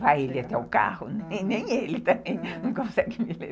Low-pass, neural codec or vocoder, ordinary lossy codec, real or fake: none; none; none; real